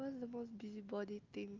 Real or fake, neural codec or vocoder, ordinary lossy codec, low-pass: real; none; Opus, 32 kbps; 7.2 kHz